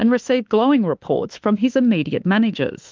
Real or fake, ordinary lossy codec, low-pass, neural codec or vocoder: fake; Opus, 32 kbps; 7.2 kHz; autoencoder, 48 kHz, 32 numbers a frame, DAC-VAE, trained on Japanese speech